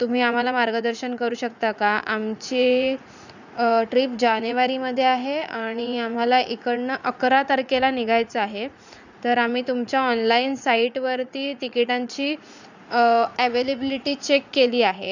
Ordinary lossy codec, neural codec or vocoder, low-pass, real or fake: none; vocoder, 44.1 kHz, 80 mel bands, Vocos; 7.2 kHz; fake